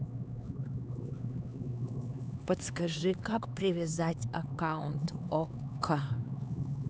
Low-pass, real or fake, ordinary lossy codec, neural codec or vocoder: none; fake; none; codec, 16 kHz, 4 kbps, X-Codec, HuBERT features, trained on LibriSpeech